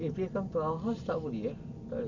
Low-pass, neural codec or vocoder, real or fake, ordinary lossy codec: 7.2 kHz; none; real; none